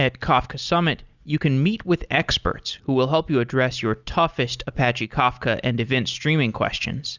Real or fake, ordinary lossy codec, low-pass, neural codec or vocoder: real; Opus, 64 kbps; 7.2 kHz; none